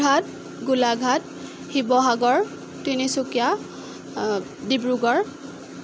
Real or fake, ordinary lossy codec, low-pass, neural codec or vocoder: real; none; none; none